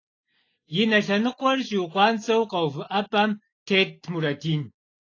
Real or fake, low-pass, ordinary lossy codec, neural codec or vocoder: real; 7.2 kHz; AAC, 32 kbps; none